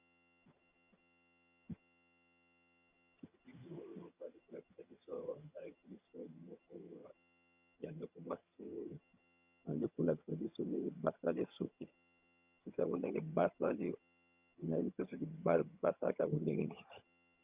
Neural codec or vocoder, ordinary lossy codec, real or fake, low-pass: vocoder, 22.05 kHz, 80 mel bands, HiFi-GAN; Opus, 64 kbps; fake; 3.6 kHz